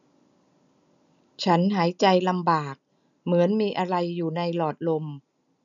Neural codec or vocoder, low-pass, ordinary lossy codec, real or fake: none; 7.2 kHz; none; real